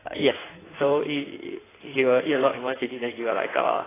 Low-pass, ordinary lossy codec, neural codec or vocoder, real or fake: 3.6 kHz; AAC, 16 kbps; codec, 16 kHz in and 24 kHz out, 1.1 kbps, FireRedTTS-2 codec; fake